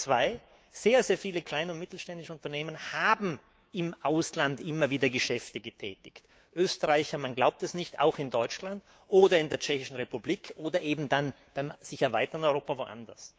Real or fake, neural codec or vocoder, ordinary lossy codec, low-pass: fake; codec, 16 kHz, 6 kbps, DAC; none; none